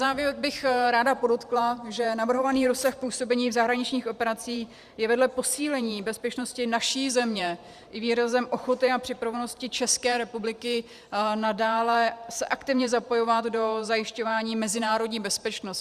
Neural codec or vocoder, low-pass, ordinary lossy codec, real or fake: vocoder, 44.1 kHz, 128 mel bands every 512 samples, BigVGAN v2; 14.4 kHz; Opus, 64 kbps; fake